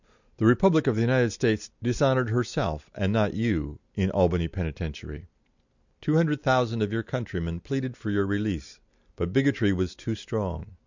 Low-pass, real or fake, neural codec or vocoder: 7.2 kHz; real; none